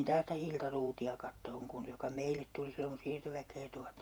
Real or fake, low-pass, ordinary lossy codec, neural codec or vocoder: fake; none; none; vocoder, 48 kHz, 128 mel bands, Vocos